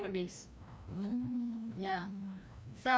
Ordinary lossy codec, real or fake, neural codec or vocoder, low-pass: none; fake; codec, 16 kHz, 1 kbps, FreqCodec, larger model; none